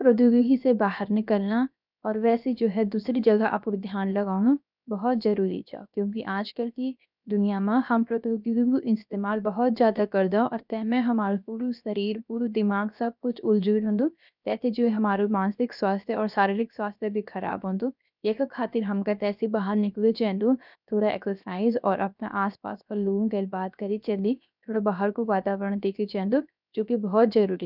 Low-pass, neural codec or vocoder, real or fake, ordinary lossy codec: 5.4 kHz; codec, 16 kHz, 0.7 kbps, FocalCodec; fake; none